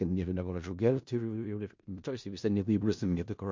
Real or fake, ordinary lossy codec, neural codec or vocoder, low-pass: fake; MP3, 48 kbps; codec, 16 kHz in and 24 kHz out, 0.4 kbps, LongCat-Audio-Codec, four codebook decoder; 7.2 kHz